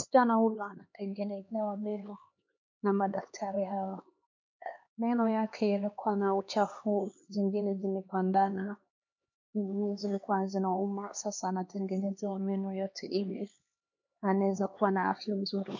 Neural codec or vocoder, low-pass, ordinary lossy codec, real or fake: codec, 16 kHz, 2 kbps, X-Codec, HuBERT features, trained on LibriSpeech; 7.2 kHz; MP3, 48 kbps; fake